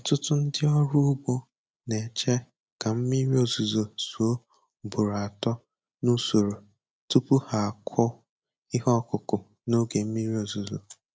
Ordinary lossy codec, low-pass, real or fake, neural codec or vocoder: none; none; real; none